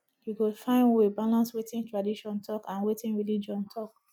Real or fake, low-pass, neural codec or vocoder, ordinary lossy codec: real; 19.8 kHz; none; none